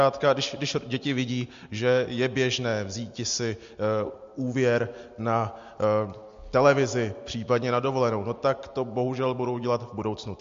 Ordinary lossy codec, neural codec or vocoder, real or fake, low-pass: MP3, 48 kbps; none; real; 7.2 kHz